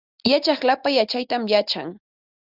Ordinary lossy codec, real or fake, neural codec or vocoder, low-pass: Opus, 64 kbps; real; none; 5.4 kHz